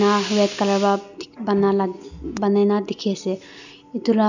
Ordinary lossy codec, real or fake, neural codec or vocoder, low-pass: none; real; none; 7.2 kHz